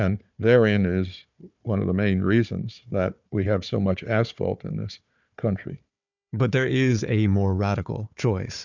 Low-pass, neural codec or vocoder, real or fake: 7.2 kHz; codec, 16 kHz, 4 kbps, FunCodec, trained on Chinese and English, 50 frames a second; fake